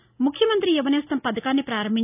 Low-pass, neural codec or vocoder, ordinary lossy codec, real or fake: 3.6 kHz; none; none; real